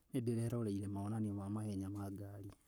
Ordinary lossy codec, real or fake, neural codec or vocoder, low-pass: none; fake; codec, 44.1 kHz, 7.8 kbps, Pupu-Codec; none